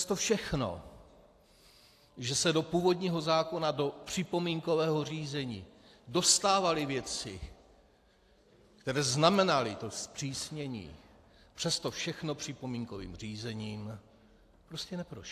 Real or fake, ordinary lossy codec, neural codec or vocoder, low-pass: real; AAC, 64 kbps; none; 14.4 kHz